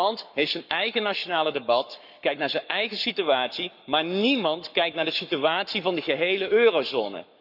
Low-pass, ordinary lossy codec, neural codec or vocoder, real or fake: 5.4 kHz; none; codec, 44.1 kHz, 7.8 kbps, Pupu-Codec; fake